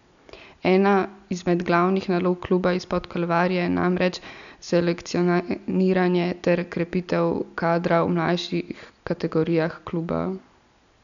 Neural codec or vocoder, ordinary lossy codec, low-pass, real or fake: none; none; 7.2 kHz; real